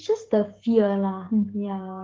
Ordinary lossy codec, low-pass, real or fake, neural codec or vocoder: Opus, 16 kbps; 7.2 kHz; fake; codec, 16 kHz, 16 kbps, FreqCodec, smaller model